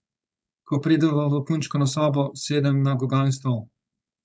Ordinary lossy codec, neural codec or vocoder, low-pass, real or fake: none; codec, 16 kHz, 4.8 kbps, FACodec; none; fake